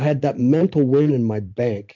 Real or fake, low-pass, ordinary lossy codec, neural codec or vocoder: real; 7.2 kHz; MP3, 48 kbps; none